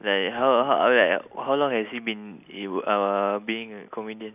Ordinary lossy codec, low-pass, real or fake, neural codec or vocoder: none; 3.6 kHz; real; none